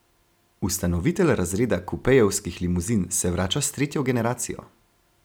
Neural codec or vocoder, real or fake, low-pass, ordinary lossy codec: none; real; none; none